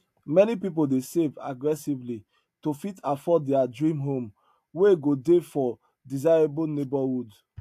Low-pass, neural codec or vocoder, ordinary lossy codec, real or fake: 14.4 kHz; none; AAC, 64 kbps; real